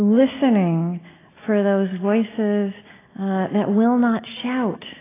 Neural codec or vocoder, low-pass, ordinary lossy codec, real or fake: none; 3.6 kHz; AAC, 16 kbps; real